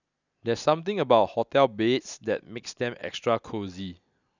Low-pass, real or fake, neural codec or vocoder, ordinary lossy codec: 7.2 kHz; real; none; none